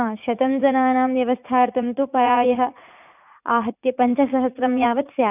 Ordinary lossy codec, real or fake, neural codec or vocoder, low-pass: none; fake; vocoder, 44.1 kHz, 80 mel bands, Vocos; 3.6 kHz